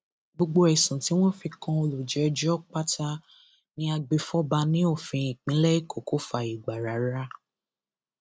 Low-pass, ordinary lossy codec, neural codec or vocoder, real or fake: none; none; none; real